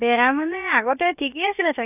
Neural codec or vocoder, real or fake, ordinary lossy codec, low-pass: codec, 16 kHz, about 1 kbps, DyCAST, with the encoder's durations; fake; none; 3.6 kHz